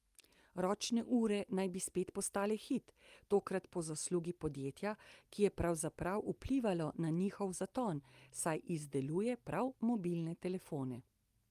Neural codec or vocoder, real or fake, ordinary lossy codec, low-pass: none; real; Opus, 32 kbps; 14.4 kHz